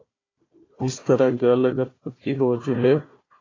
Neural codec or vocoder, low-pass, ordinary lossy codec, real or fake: codec, 16 kHz, 1 kbps, FunCodec, trained on Chinese and English, 50 frames a second; 7.2 kHz; AAC, 32 kbps; fake